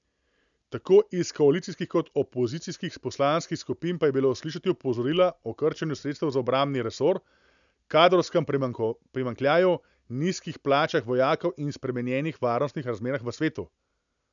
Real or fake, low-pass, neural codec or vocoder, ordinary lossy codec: real; 7.2 kHz; none; none